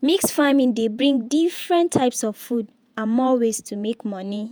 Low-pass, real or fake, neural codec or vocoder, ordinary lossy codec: none; fake; vocoder, 48 kHz, 128 mel bands, Vocos; none